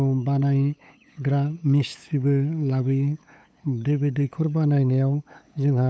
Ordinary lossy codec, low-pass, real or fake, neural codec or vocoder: none; none; fake; codec, 16 kHz, 8 kbps, FunCodec, trained on LibriTTS, 25 frames a second